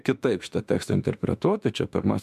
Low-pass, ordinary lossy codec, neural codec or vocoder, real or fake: 14.4 kHz; MP3, 96 kbps; autoencoder, 48 kHz, 32 numbers a frame, DAC-VAE, trained on Japanese speech; fake